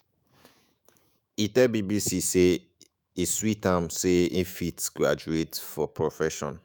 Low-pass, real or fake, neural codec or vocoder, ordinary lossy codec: none; fake; autoencoder, 48 kHz, 128 numbers a frame, DAC-VAE, trained on Japanese speech; none